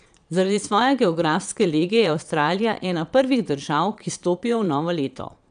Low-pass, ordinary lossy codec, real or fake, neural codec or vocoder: 9.9 kHz; none; fake; vocoder, 22.05 kHz, 80 mel bands, Vocos